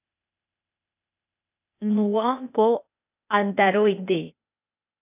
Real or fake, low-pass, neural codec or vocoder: fake; 3.6 kHz; codec, 16 kHz, 0.8 kbps, ZipCodec